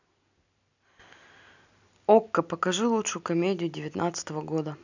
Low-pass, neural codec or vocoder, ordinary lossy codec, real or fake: 7.2 kHz; none; none; real